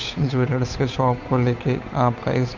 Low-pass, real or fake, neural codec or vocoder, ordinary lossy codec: 7.2 kHz; fake; codec, 16 kHz, 8 kbps, FunCodec, trained on Chinese and English, 25 frames a second; none